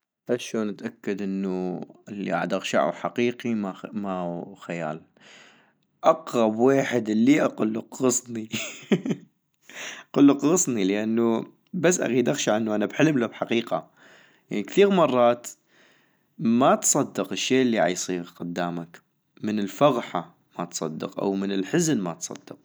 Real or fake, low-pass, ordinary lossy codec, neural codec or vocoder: real; none; none; none